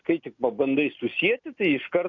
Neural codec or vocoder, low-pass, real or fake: none; 7.2 kHz; real